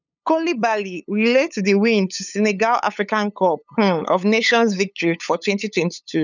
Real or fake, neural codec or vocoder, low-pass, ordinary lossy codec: fake; codec, 16 kHz, 8 kbps, FunCodec, trained on LibriTTS, 25 frames a second; 7.2 kHz; none